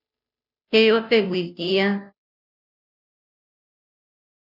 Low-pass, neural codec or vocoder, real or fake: 5.4 kHz; codec, 16 kHz, 0.5 kbps, FunCodec, trained on Chinese and English, 25 frames a second; fake